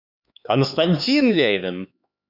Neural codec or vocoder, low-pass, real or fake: codec, 16 kHz, 4 kbps, X-Codec, HuBERT features, trained on LibriSpeech; 5.4 kHz; fake